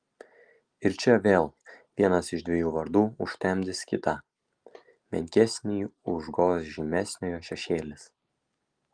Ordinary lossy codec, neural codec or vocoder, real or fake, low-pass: Opus, 32 kbps; none; real; 9.9 kHz